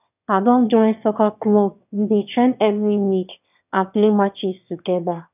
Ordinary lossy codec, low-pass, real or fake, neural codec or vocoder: none; 3.6 kHz; fake; autoencoder, 22.05 kHz, a latent of 192 numbers a frame, VITS, trained on one speaker